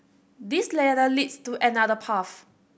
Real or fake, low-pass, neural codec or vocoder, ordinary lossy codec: real; none; none; none